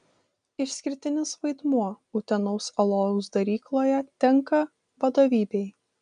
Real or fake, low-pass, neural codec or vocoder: real; 9.9 kHz; none